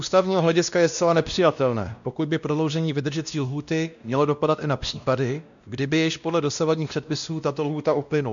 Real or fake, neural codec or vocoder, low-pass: fake; codec, 16 kHz, 1 kbps, X-Codec, WavLM features, trained on Multilingual LibriSpeech; 7.2 kHz